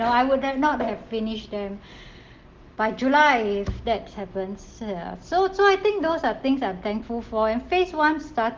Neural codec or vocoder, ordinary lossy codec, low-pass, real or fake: none; Opus, 16 kbps; 7.2 kHz; real